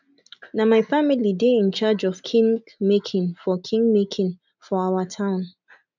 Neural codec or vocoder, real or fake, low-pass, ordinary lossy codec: none; real; 7.2 kHz; none